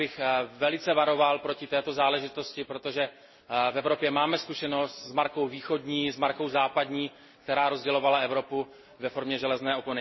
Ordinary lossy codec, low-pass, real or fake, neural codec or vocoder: MP3, 24 kbps; 7.2 kHz; real; none